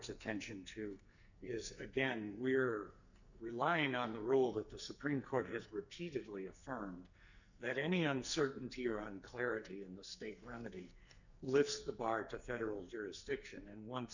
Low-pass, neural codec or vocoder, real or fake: 7.2 kHz; codec, 44.1 kHz, 2.6 kbps, SNAC; fake